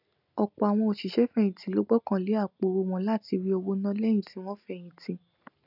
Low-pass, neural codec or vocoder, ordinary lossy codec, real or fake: 5.4 kHz; none; none; real